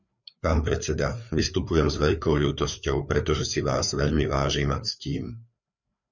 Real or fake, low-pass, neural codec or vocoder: fake; 7.2 kHz; codec, 16 kHz, 4 kbps, FreqCodec, larger model